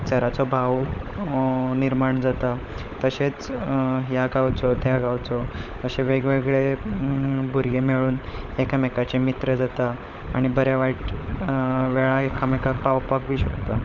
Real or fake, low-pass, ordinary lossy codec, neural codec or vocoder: fake; 7.2 kHz; none; codec, 16 kHz, 16 kbps, FunCodec, trained on LibriTTS, 50 frames a second